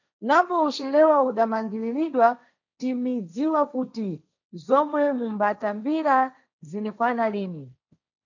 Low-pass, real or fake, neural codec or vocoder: 7.2 kHz; fake; codec, 16 kHz, 1.1 kbps, Voila-Tokenizer